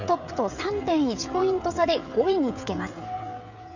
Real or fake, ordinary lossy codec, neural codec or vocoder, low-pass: fake; none; codec, 16 kHz, 16 kbps, FreqCodec, smaller model; 7.2 kHz